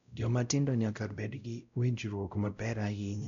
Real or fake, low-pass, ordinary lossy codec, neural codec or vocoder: fake; 7.2 kHz; none; codec, 16 kHz, 0.5 kbps, X-Codec, WavLM features, trained on Multilingual LibriSpeech